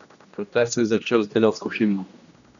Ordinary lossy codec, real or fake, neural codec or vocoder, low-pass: none; fake; codec, 16 kHz, 1 kbps, X-Codec, HuBERT features, trained on general audio; 7.2 kHz